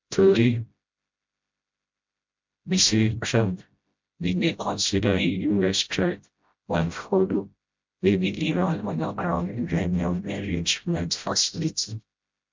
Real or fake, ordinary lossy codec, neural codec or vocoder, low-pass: fake; MP3, 64 kbps; codec, 16 kHz, 0.5 kbps, FreqCodec, smaller model; 7.2 kHz